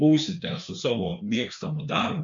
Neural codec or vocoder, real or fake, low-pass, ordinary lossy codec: codec, 16 kHz, 2 kbps, FreqCodec, larger model; fake; 7.2 kHz; MP3, 64 kbps